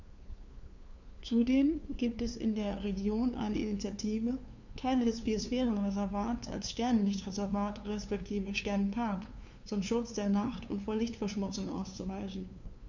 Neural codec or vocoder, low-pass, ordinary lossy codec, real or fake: codec, 16 kHz, 2 kbps, FunCodec, trained on LibriTTS, 25 frames a second; 7.2 kHz; none; fake